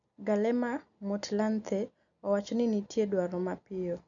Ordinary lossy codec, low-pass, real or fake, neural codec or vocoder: none; 7.2 kHz; real; none